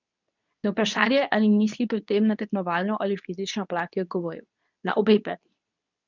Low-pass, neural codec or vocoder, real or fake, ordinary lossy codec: 7.2 kHz; codec, 24 kHz, 0.9 kbps, WavTokenizer, medium speech release version 2; fake; none